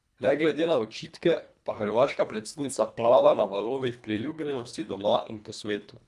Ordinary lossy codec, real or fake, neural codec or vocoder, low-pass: none; fake; codec, 24 kHz, 1.5 kbps, HILCodec; none